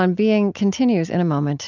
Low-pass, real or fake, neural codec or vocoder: 7.2 kHz; real; none